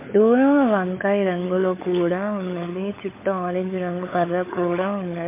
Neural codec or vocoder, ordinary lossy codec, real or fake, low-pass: codec, 16 kHz, 16 kbps, FunCodec, trained on LibriTTS, 50 frames a second; MP3, 24 kbps; fake; 3.6 kHz